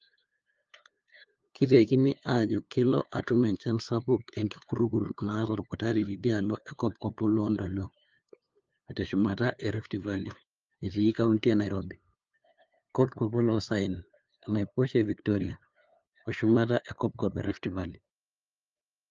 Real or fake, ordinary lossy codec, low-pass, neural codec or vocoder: fake; Opus, 24 kbps; 7.2 kHz; codec, 16 kHz, 2 kbps, FunCodec, trained on LibriTTS, 25 frames a second